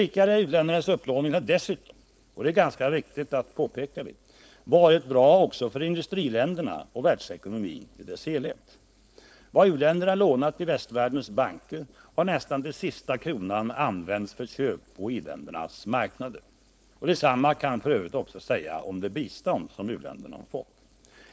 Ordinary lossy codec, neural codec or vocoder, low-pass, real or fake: none; codec, 16 kHz, 4.8 kbps, FACodec; none; fake